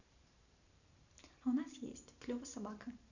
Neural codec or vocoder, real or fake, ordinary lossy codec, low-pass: vocoder, 44.1 kHz, 128 mel bands every 512 samples, BigVGAN v2; fake; none; 7.2 kHz